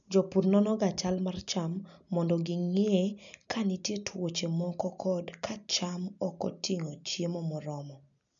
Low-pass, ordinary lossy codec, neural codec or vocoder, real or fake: 7.2 kHz; none; none; real